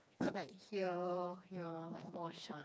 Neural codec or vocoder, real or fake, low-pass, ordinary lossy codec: codec, 16 kHz, 2 kbps, FreqCodec, smaller model; fake; none; none